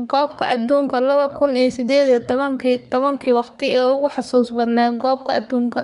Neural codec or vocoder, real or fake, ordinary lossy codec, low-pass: codec, 24 kHz, 1 kbps, SNAC; fake; none; 10.8 kHz